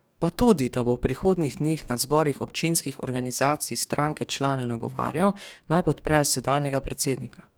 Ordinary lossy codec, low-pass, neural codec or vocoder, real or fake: none; none; codec, 44.1 kHz, 2.6 kbps, DAC; fake